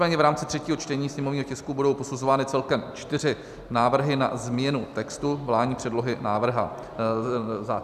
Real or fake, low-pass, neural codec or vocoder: real; 14.4 kHz; none